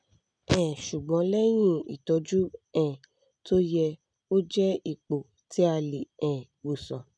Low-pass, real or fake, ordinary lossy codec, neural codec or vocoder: 9.9 kHz; real; none; none